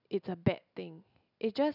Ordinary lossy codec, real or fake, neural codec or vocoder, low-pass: none; real; none; 5.4 kHz